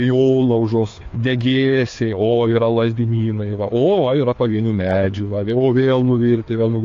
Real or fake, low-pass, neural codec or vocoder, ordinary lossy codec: fake; 7.2 kHz; codec, 16 kHz, 2 kbps, FreqCodec, larger model; AAC, 48 kbps